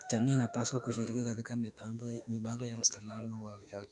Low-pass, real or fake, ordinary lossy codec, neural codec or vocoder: 10.8 kHz; fake; none; autoencoder, 48 kHz, 32 numbers a frame, DAC-VAE, trained on Japanese speech